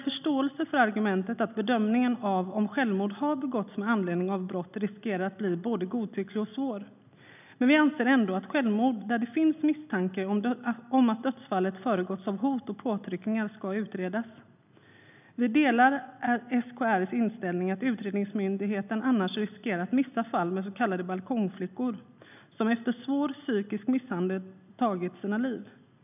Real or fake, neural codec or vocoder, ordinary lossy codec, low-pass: real; none; none; 3.6 kHz